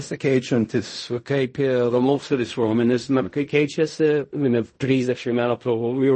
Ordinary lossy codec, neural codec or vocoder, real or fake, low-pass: MP3, 32 kbps; codec, 16 kHz in and 24 kHz out, 0.4 kbps, LongCat-Audio-Codec, fine tuned four codebook decoder; fake; 10.8 kHz